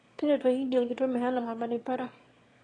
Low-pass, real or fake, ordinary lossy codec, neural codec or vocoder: 9.9 kHz; fake; AAC, 32 kbps; autoencoder, 22.05 kHz, a latent of 192 numbers a frame, VITS, trained on one speaker